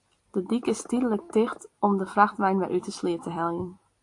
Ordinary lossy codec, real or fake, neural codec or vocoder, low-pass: AAC, 48 kbps; real; none; 10.8 kHz